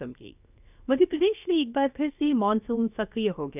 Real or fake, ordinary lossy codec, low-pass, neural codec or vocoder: fake; none; 3.6 kHz; codec, 16 kHz, 0.7 kbps, FocalCodec